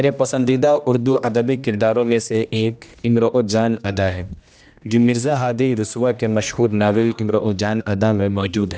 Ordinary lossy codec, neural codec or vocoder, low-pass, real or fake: none; codec, 16 kHz, 1 kbps, X-Codec, HuBERT features, trained on general audio; none; fake